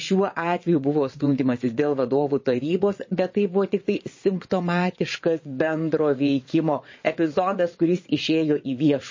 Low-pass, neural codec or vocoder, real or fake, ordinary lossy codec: 7.2 kHz; vocoder, 22.05 kHz, 80 mel bands, WaveNeXt; fake; MP3, 32 kbps